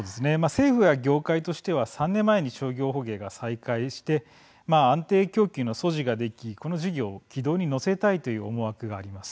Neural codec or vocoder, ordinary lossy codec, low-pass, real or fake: none; none; none; real